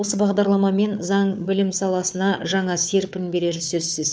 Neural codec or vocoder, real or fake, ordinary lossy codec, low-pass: codec, 16 kHz, 4 kbps, FunCodec, trained on Chinese and English, 50 frames a second; fake; none; none